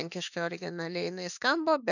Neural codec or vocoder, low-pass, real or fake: autoencoder, 48 kHz, 32 numbers a frame, DAC-VAE, trained on Japanese speech; 7.2 kHz; fake